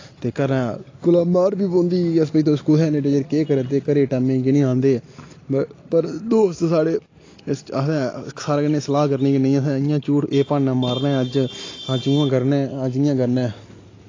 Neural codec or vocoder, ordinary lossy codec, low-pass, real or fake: none; AAC, 32 kbps; 7.2 kHz; real